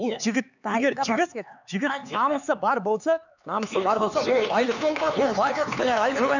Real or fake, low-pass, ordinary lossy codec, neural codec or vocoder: fake; 7.2 kHz; none; codec, 16 kHz, 4 kbps, X-Codec, HuBERT features, trained on LibriSpeech